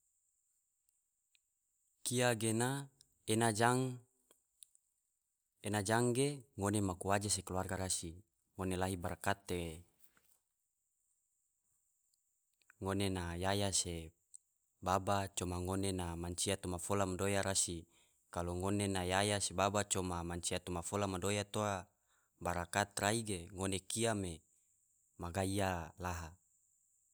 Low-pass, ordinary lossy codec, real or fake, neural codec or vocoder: none; none; real; none